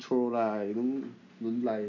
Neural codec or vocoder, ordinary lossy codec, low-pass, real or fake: none; none; 7.2 kHz; real